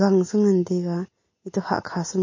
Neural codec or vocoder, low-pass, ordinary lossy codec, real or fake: none; 7.2 kHz; MP3, 32 kbps; real